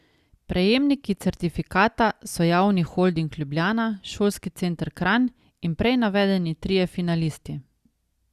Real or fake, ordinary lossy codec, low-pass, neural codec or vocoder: real; Opus, 64 kbps; 14.4 kHz; none